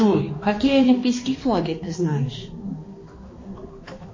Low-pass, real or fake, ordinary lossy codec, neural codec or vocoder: 7.2 kHz; fake; MP3, 32 kbps; codec, 16 kHz, 2 kbps, X-Codec, HuBERT features, trained on balanced general audio